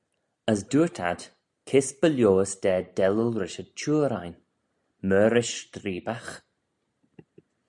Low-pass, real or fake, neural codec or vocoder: 10.8 kHz; real; none